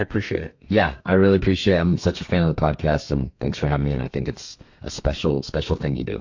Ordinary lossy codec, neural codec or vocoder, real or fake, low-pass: AAC, 48 kbps; codec, 32 kHz, 1.9 kbps, SNAC; fake; 7.2 kHz